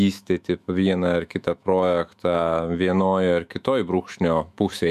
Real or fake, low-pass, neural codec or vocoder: fake; 14.4 kHz; autoencoder, 48 kHz, 128 numbers a frame, DAC-VAE, trained on Japanese speech